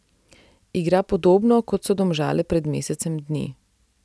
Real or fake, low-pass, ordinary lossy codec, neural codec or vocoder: real; none; none; none